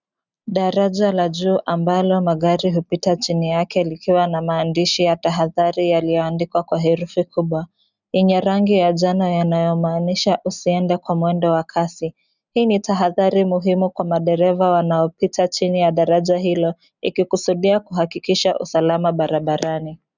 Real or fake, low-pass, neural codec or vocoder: real; 7.2 kHz; none